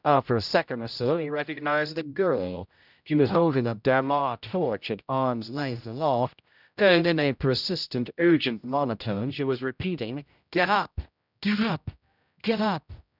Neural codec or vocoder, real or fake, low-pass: codec, 16 kHz, 0.5 kbps, X-Codec, HuBERT features, trained on general audio; fake; 5.4 kHz